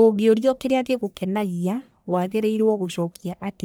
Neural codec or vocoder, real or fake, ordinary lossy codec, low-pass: codec, 44.1 kHz, 1.7 kbps, Pupu-Codec; fake; none; none